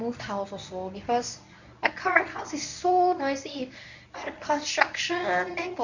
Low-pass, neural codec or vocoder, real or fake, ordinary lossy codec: 7.2 kHz; codec, 24 kHz, 0.9 kbps, WavTokenizer, medium speech release version 1; fake; none